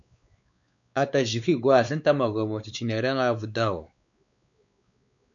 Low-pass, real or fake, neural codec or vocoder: 7.2 kHz; fake; codec, 16 kHz, 4 kbps, X-Codec, WavLM features, trained on Multilingual LibriSpeech